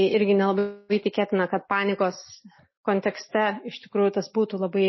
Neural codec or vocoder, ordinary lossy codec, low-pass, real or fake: none; MP3, 24 kbps; 7.2 kHz; real